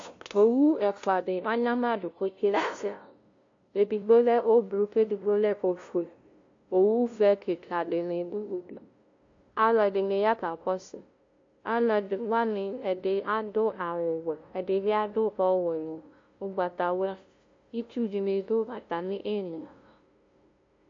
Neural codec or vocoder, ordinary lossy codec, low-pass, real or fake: codec, 16 kHz, 0.5 kbps, FunCodec, trained on LibriTTS, 25 frames a second; AAC, 48 kbps; 7.2 kHz; fake